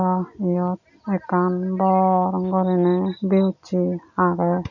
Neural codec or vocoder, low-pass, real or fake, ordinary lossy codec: none; 7.2 kHz; real; none